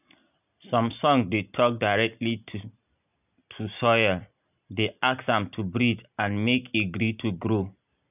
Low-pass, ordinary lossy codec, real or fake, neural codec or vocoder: 3.6 kHz; none; real; none